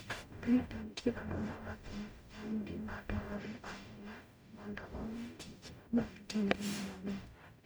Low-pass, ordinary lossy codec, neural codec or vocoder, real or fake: none; none; codec, 44.1 kHz, 0.9 kbps, DAC; fake